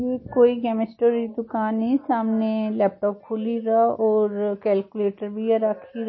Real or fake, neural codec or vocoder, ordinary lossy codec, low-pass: real; none; MP3, 24 kbps; 7.2 kHz